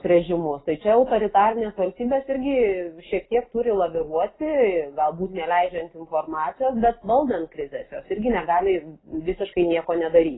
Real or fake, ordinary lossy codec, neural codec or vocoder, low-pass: real; AAC, 16 kbps; none; 7.2 kHz